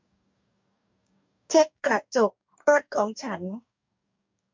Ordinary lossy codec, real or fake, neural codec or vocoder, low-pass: MP3, 64 kbps; fake; codec, 44.1 kHz, 2.6 kbps, DAC; 7.2 kHz